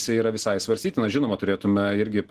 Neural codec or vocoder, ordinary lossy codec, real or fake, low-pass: none; Opus, 16 kbps; real; 14.4 kHz